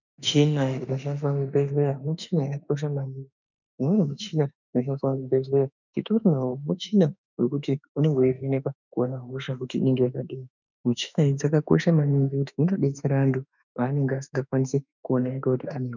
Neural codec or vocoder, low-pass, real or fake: autoencoder, 48 kHz, 32 numbers a frame, DAC-VAE, trained on Japanese speech; 7.2 kHz; fake